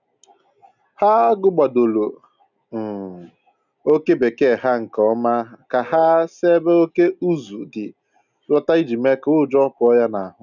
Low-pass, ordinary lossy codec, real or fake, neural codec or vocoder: 7.2 kHz; none; real; none